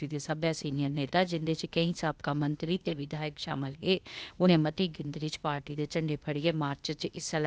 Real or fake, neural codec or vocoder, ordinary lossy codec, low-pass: fake; codec, 16 kHz, 0.8 kbps, ZipCodec; none; none